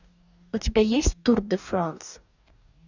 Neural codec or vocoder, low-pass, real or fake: codec, 44.1 kHz, 2.6 kbps, DAC; 7.2 kHz; fake